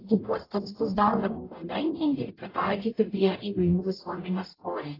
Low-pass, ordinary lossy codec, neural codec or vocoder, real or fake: 5.4 kHz; AAC, 24 kbps; codec, 44.1 kHz, 0.9 kbps, DAC; fake